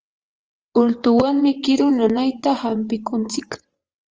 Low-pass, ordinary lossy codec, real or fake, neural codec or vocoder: 7.2 kHz; Opus, 24 kbps; fake; vocoder, 22.05 kHz, 80 mel bands, WaveNeXt